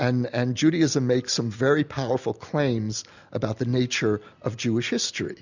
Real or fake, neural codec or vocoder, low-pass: real; none; 7.2 kHz